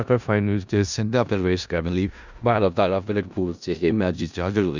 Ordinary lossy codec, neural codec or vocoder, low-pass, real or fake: none; codec, 16 kHz in and 24 kHz out, 0.4 kbps, LongCat-Audio-Codec, four codebook decoder; 7.2 kHz; fake